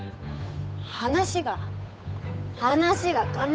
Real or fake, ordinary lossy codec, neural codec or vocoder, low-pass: fake; none; codec, 16 kHz, 8 kbps, FunCodec, trained on Chinese and English, 25 frames a second; none